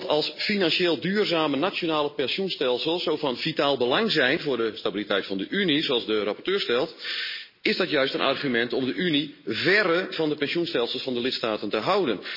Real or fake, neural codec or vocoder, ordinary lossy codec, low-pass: real; none; MP3, 24 kbps; 5.4 kHz